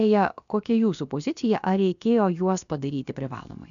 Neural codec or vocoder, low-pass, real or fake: codec, 16 kHz, about 1 kbps, DyCAST, with the encoder's durations; 7.2 kHz; fake